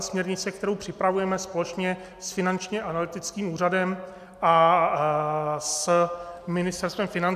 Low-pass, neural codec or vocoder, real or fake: 14.4 kHz; none; real